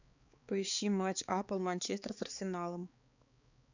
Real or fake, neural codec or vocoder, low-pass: fake; codec, 16 kHz, 4 kbps, X-Codec, HuBERT features, trained on balanced general audio; 7.2 kHz